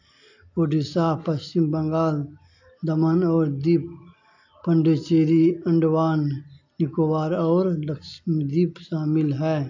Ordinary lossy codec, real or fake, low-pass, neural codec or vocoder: AAC, 48 kbps; real; 7.2 kHz; none